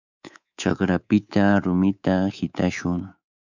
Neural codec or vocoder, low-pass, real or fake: codec, 24 kHz, 3.1 kbps, DualCodec; 7.2 kHz; fake